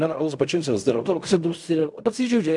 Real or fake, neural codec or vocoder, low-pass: fake; codec, 16 kHz in and 24 kHz out, 0.4 kbps, LongCat-Audio-Codec, fine tuned four codebook decoder; 10.8 kHz